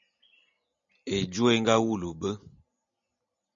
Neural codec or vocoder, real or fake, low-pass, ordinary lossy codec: none; real; 7.2 kHz; MP3, 96 kbps